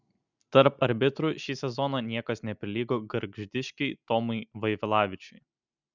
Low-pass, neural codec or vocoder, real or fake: 7.2 kHz; none; real